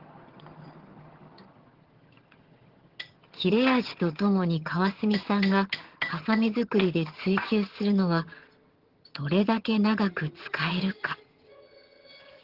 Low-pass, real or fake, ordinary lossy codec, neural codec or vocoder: 5.4 kHz; fake; Opus, 16 kbps; vocoder, 22.05 kHz, 80 mel bands, HiFi-GAN